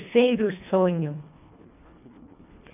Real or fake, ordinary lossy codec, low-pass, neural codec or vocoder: fake; none; 3.6 kHz; codec, 24 kHz, 1.5 kbps, HILCodec